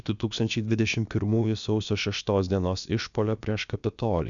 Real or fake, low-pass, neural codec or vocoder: fake; 7.2 kHz; codec, 16 kHz, about 1 kbps, DyCAST, with the encoder's durations